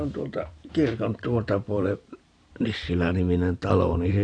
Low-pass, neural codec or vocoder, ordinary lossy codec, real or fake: 9.9 kHz; vocoder, 44.1 kHz, 128 mel bands every 512 samples, BigVGAN v2; AAC, 48 kbps; fake